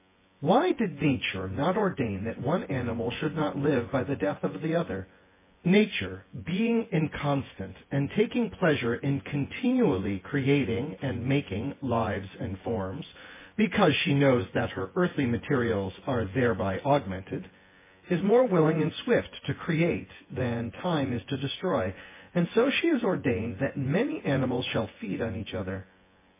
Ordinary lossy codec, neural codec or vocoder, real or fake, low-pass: MP3, 16 kbps; vocoder, 24 kHz, 100 mel bands, Vocos; fake; 3.6 kHz